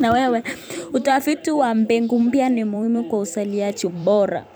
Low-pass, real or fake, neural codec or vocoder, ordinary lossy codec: none; fake; vocoder, 44.1 kHz, 128 mel bands every 256 samples, BigVGAN v2; none